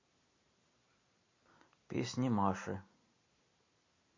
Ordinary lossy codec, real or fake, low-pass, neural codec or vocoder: MP3, 32 kbps; real; 7.2 kHz; none